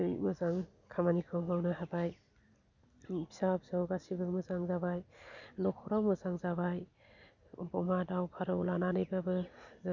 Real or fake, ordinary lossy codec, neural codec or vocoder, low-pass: real; none; none; 7.2 kHz